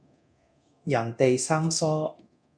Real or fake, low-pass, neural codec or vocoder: fake; 9.9 kHz; codec, 24 kHz, 0.9 kbps, DualCodec